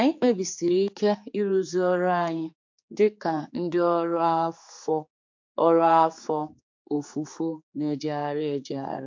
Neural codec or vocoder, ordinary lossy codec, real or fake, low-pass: codec, 16 kHz, 4 kbps, X-Codec, HuBERT features, trained on general audio; MP3, 48 kbps; fake; 7.2 kHz